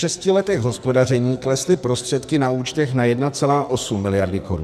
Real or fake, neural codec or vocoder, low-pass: fake; codec, 44.1 kHz, 2.6 kbps, SNAC; 14.4 kHz